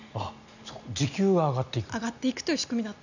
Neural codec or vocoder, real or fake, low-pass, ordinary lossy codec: none; real; 7.2 kHz; none